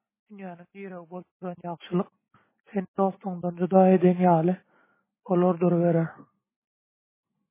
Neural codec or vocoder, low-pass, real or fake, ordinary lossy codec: none; 3.6 kHz; real; MP3, 16 kbps